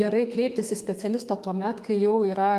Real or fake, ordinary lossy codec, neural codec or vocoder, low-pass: fake; Opus, 32 kbps; codec, 32 kHz, 1.9 kbps, SNAC; 14.4 kHz